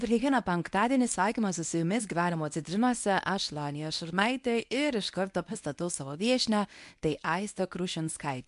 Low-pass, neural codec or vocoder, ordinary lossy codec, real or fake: 10.8 kHz; codec, 24 kHz, 0.9 kbps, WavTokenizer, medium speech release version 1; MP3, 64 kbps; fake